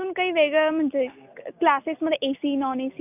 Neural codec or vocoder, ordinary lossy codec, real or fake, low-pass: none; none; real; 3.6 kHz